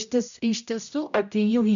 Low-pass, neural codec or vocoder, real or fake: 7.2 kHz; codec, 16 kHz, 0.5 kbps, X-Codec, HuBERT features, trained on general audio; fake